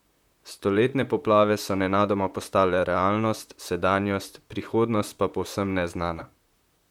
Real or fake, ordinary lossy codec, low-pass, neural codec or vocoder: fake; MP3, 96 kbps; 19.8 kHz; vocoder, 44.1 kHz, 128 mel bands, Pupu-Vocoder